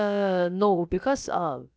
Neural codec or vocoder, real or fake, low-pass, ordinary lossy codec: codec, 16 kHz, about 1 kbps, DyCAST, with the encoder's durations; fake; none; none